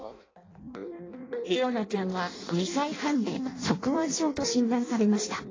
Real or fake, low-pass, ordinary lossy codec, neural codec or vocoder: fake; 7.2 kHz; AAC, 32 kbps; codec, 16 kHz in and 24 kHz out, 0.6 kbps, FireRedTTS-2 codec